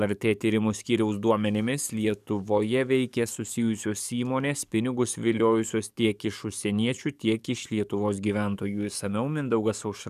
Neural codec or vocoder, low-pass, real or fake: codec, 44.1 kHz, 7.8 kbps, DAC; 14.4 kHz; fake